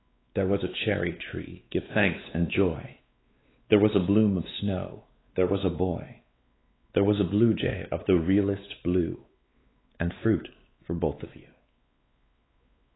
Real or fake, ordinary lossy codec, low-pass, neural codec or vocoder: fake; AAC, 16 kbps; 7.2 kHz; codec, 16 kHz, 4 kbps, X-Codec, WavLM features, trained on Multilingual LibriSpeech